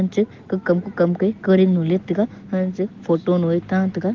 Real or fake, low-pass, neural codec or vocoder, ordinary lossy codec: real; 7.2 kHz; none; Opus, 16 kbps